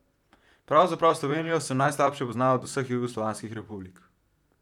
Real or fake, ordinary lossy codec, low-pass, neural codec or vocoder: fake; none; 19.8 kHz; vocoder, 44.1 kHz, 128 mel bands, Pupu-Vocoder